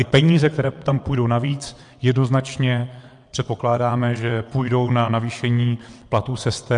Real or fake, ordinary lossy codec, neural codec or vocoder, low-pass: fake; MP3, 64 kbps; vocoder, 22.05 kHz, 80 mel bands, WaveNeXt; 9.9 kHz